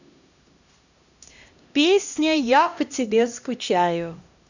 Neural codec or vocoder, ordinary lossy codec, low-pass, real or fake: codec, 16 kHz, 1 kbps, X-Codec, HuBERT features, trained on LibriSpeech; none; 7.2 kHz; fake